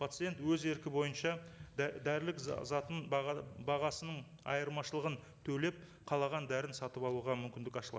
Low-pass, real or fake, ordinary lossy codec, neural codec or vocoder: none; real; none; none